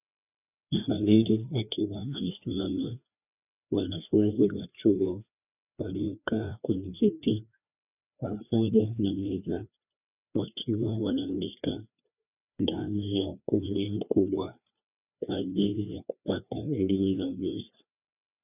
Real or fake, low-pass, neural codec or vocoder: fake; 3.6 kHz; codec, 16 kHz, 2 kbps, FreqCodec, larger model